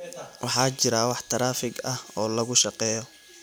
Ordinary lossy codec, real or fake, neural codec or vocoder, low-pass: none; real; none; none